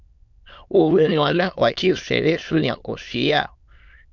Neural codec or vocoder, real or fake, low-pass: autoencoder, 22.05 kHz, a latent of 192 numbers a frame, VITS, trained on many speakers; fake; 7.2 kHz